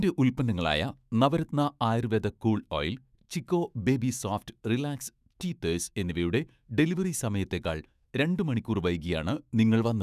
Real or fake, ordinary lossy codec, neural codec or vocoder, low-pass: fake; none; autoencoder, 48 kHz, 128 numbers a frame, DAC-VAE, trained on Japanese speech; 14.4 kHz